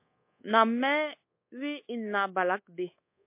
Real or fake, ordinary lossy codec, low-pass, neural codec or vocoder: fake; MP3, 24 kbps; 3.6 kHz; codec, 24 kHz, 1.2 kbps, DualCodec